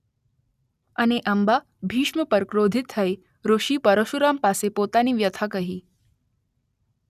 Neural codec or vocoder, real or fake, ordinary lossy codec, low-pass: none; real; none; 14.4 kHz